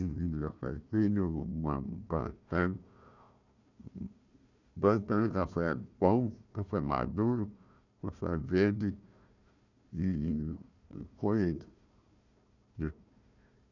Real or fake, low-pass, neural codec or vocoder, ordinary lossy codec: fake; 7.2 kHz; codec, 16 kHz, 1 kbps, FunCodec, trained on Chinese and English, 50 frames a second; none